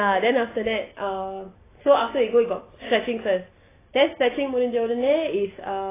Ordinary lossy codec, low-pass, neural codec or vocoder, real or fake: AAC, 16 kbps; 3.6 kHz; none; real